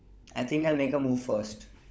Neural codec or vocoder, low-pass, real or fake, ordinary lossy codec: codec, 16 kHz, 16 kbps, FunCodec, trained on LibriTTS, 50 frames a second; none; fake; none